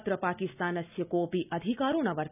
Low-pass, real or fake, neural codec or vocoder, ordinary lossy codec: 3.6 kHz; real; none; none